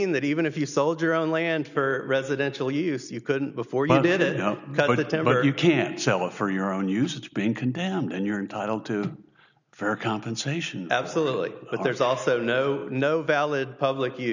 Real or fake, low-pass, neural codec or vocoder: real; 7.2 kHz; none